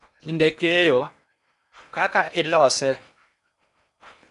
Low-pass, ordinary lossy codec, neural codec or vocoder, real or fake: 10.8 kHz; none; codec, 16 kHz in and 24 kHz out, 0.8 kbps, FocalCodec, streaming, 65536 codes; fake